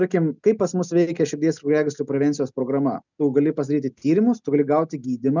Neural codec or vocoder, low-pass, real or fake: none; 7.2 kHz; real